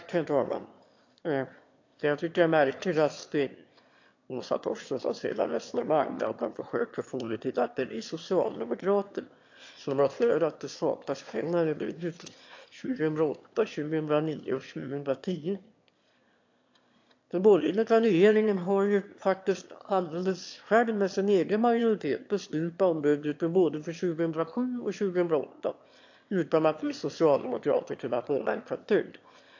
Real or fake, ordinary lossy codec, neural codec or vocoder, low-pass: fake; AAC, 48 kbps; autoencoder, 22.05 kHz, a latent of 192 numbers a frame, VITS, trained on one speaker; 7.2 kHz